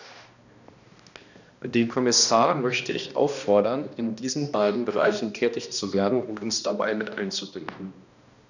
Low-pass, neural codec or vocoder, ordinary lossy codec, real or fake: 7.2 kHz; codec, 16 kHz, 1 kbps, X-Codec, HuBERT features, trained on balanced general audio; none; fake